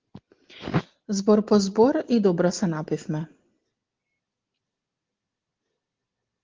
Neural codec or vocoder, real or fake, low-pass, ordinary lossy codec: none; real; 7.2 kHz; Opus, 16 kbps